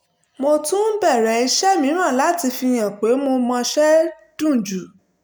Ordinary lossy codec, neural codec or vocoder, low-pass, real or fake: none; none; none; real